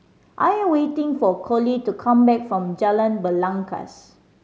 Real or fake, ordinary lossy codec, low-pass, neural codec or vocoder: real; none; none; none